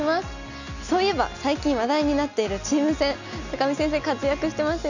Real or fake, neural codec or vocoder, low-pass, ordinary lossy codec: real; none; 7.2 kHz; none